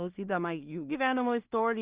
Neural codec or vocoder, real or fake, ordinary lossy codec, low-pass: codec, 16 kHz in and 24 kHz out, 0.4 kbps, LongCat-Audio-Codec, two codebook decoder; fake; Opus, 32 kbps; 3.6 kHz